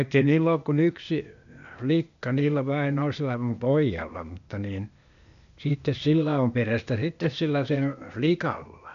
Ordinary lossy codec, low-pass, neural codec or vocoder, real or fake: none; 7.2 kHz; codec, 16 kHz, 0.8 kbps, ZipCodec; fake